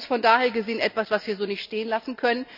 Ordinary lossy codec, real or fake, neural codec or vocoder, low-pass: none; real; none; 5.4 kHz